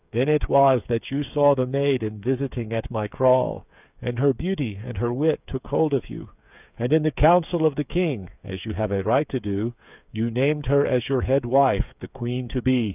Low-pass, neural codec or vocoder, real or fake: 3.6 kHz; codec, 16 kHz, 8 kbps, FreqCodec, smaller model; fake